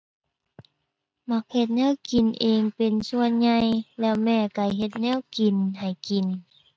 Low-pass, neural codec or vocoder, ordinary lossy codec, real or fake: none; none; none; real